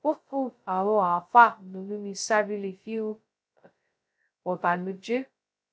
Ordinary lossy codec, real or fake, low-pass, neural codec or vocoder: none; fake; none; codec, 16 kHz, 0.2 kbps, FocalCodec